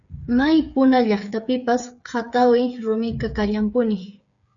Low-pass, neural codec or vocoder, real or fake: 7.2 kHz; codec, 16 kHz, 8 kbps, FreqCodec, smaller model; fake